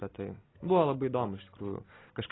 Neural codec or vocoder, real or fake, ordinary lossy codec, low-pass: none; real; AAC, 16 kbps; 7.2 kHz